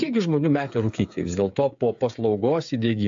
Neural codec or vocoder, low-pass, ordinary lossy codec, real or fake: codec, 16 kHz, 8 kbps, FreqCodec, smaller model; 7.2 kHz; AAC, 64 kbps; fake